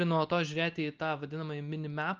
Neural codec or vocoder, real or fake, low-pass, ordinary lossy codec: none; real; 7.2 kHz; Opus, 24 kbps